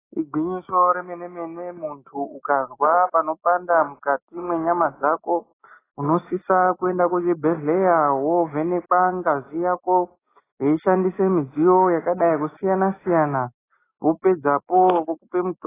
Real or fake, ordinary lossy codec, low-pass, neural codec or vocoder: real; AAC, 16 kbps; 3.6 kHz; none